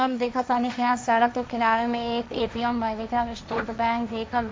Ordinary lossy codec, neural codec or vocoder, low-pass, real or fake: none; codec, 16 kHz, 1.1 kbps, Voila-Tokenizer; none; fake